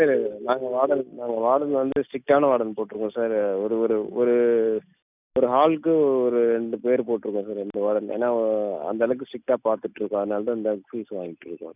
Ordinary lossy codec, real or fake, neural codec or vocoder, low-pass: none; real; none; 3.6 kHz